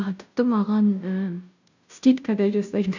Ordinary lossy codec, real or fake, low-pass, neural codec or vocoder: none; fake; 7.2 kHz; codec, 16 kHz, 0.5 kbps, FunCodec, trained on Chinese and English, 25 frames a second